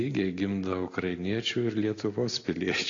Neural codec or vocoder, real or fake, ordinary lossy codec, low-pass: none; real; AAC, 32 kbps; 7.2 kHz